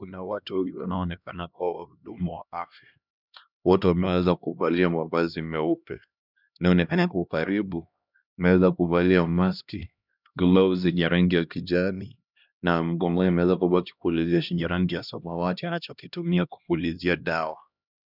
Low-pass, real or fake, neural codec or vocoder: 5.4 kHz; fake; codec, 16 kHz, 1 kbps, X-Codec, HuBERT features, trained on LibriSpeech